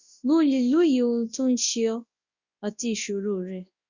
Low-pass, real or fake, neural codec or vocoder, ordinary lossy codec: 7.2 kHz; fake; codec, 24 kHz, 0.9 kbps, WavTokenizer, large speech release; Opus, 64 kbps